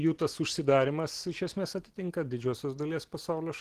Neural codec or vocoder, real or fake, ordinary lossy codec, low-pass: none; real; Opus, 16 kbps; 14.4 kHz